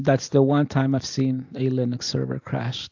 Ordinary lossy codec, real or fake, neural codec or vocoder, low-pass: AAC, 48 kbps; real; none; 7.2 kHz